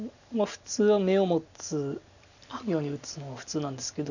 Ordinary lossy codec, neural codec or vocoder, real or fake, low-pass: none; none; real; 7.2 kHz